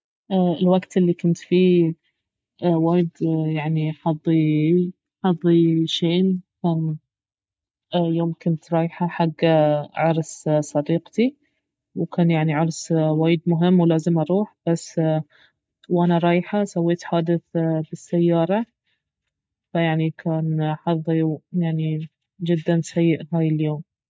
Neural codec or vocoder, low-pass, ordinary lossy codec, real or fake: none; none; none; real